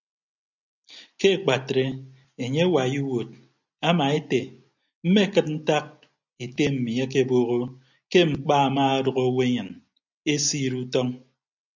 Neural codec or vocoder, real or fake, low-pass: none; real; 7.2 kHz